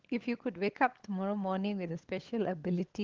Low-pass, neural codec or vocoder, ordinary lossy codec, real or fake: 7.2 kHz; codec, 16 kHz, 16 kbps, FunCodec, trained on LibriTTS, 50 frames a second; Opus, 16 kbps; fake